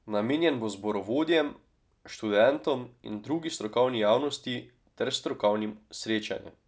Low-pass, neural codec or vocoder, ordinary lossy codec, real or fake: none; none; none; real